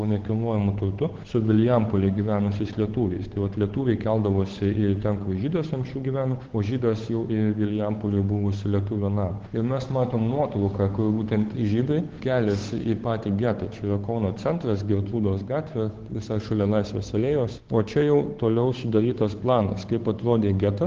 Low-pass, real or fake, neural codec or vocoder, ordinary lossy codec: 7.2 kHz; fake; codec, 16 kHz, 8 kbps, FunCodec, trained on Chinese and English, 25 frames a second; Opus, 16 kbps